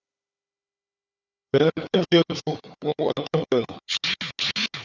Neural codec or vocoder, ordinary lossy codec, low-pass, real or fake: codec, 16 kHz, 4 kbps, FunCodec, trained on Chinese and English, 50 frames a second; Opus, 64 kbps; 7.2 kHz; fake